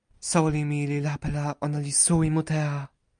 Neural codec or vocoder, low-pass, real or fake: none; 9.9 kHz; real